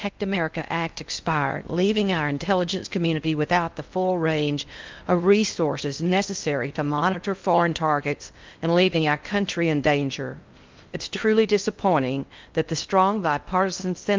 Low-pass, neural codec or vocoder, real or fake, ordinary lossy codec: 7.2 kHz; codec, 16 kHz in and 24 kHz out, 0.6 kbps, FocalCodec, streaming, 2048 codes; fake; Opus, 24 kbps